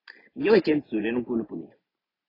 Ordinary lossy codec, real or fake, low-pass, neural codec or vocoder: AAC, 24 kbps; real; 5.4 kHz; none